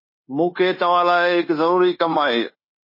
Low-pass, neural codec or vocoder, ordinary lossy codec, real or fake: 5.4 kHz; codec, 16 kHz, 0.9 kbps, LongCat-Audio-Codec; MP3, 24 kbps; fake